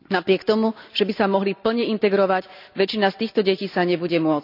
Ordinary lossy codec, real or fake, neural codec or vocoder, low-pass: none; real; none; 5.4 kHz